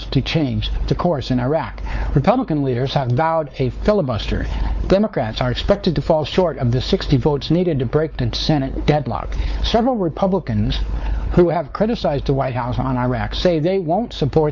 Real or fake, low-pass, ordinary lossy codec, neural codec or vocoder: fake; 7.2 kHz; Opus, 64 kbps; codec, 16 kHz, 4 kbps, X-Codec, WavLM features, trained on Multilingual LibriSpeech